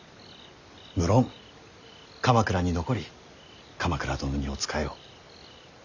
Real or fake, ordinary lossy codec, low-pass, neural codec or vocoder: real; none; 7.2 kHz; none